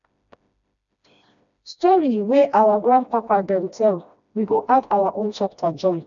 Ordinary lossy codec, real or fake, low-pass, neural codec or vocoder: none; fake; 7.2 kHz; codec, 16 kHz, 1 kbps, FreqCodec, smaller model